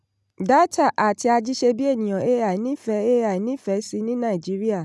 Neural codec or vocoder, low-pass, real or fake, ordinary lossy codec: none; none; real; none